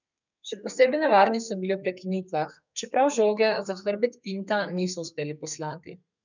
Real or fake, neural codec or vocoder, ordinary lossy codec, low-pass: fake; codec, 32 kHz, 1.9 kbps, SNAC; none; 7.2 kHz